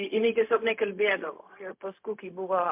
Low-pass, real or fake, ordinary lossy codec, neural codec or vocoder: 3.6 kHz; fake; MP3, 32 kbps; codec, 16 kHz, 0.4 kbps, LongCat-Audio-Codec